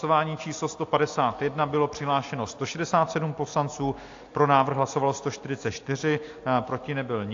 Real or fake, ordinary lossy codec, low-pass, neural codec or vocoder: real; AAC, 48 kbps; 7.2 kHz; none